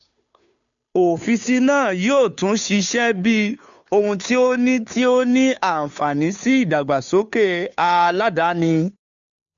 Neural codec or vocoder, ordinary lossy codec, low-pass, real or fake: codec, 16 kHz, 2 kbps, FunCodec, trained on Chinese and English, 25 frames a second; none; 7.2 kHz; fake